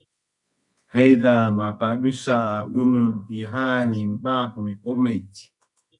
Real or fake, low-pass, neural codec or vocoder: fake; 10.8 kHz; codec, 24 kHz, 0.9 kbps, WavTokenizer, medium music audio release